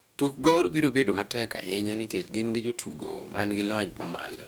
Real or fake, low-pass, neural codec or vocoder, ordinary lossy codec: fake; none; codec, 44.1 kHz, 2.6 kbps, DAC; none